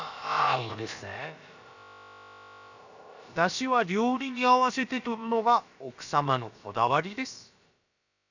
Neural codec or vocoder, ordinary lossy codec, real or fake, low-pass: codec, 16 kHz, about 1 kbps, DyCAST, with the encoder's durations; none; fake; 7.2 kHz